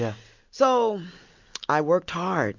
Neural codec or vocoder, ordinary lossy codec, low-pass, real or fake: none; MP3, 64 kbps; 7.2 kHz; real